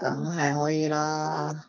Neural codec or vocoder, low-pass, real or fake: codec, 32 kHz, 1.9 kbps, SNAC; 7.2 kHz; fake